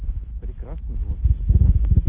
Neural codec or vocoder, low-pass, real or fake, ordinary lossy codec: none; 3.6 kHz; real; Opus, 16 kbps